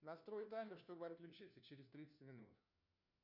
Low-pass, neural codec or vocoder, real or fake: 5.4 kHz; codec, 16 kHz, 1 kbps, FunCodec, trained on LibriTTS, 50 frames a second; fake